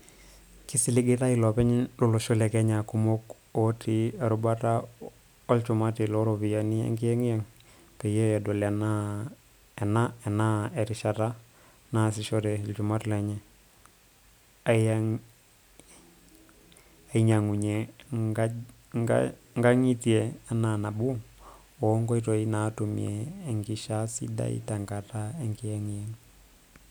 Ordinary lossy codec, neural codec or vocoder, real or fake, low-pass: none; none; real; none